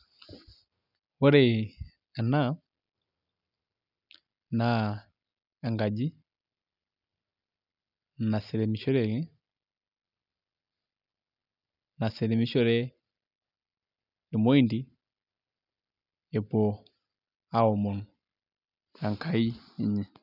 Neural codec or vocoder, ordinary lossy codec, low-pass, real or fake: none; none; 5.4 kHz; real